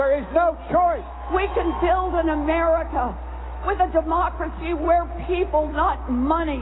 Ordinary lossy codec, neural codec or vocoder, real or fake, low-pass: AAC, 16 kbps; none; real; 7.2 kHz